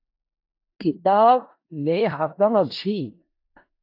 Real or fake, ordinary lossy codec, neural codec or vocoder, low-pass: fake; AAC, 32 kbps; codec, 16 kHz in and 24 kHz out, 0.4 kbps, LongCat-Audio-Codec, four codebook decoder; 5.4 kHz